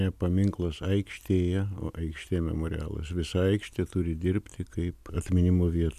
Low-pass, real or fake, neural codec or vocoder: 14.4 kHz; real; none